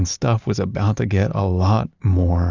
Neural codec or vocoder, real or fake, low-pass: none; real; 7.2 kHz